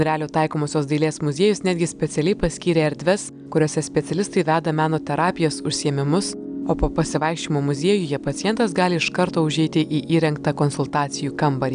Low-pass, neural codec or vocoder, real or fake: 9.9 kHz; none; real